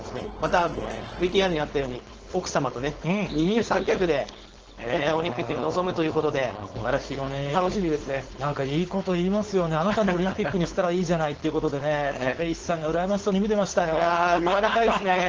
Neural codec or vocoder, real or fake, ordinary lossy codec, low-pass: codec, 16 kHz, 4.8 kbps, FACodec; fake; Opus, 16 kbps; 7.2 kHz